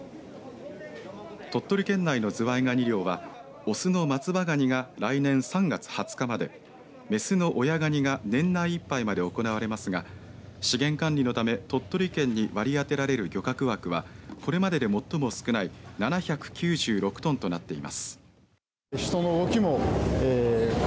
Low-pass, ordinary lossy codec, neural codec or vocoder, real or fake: none; none; none; real